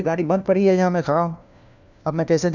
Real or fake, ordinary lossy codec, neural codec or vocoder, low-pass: fake; none; codec, 16 kHz, 1 kbps, FunCodec, trained on LibriTTS, 50 frames a second; 7.2 kHz